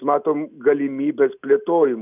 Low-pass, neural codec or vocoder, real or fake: 3.6 kHz; none; real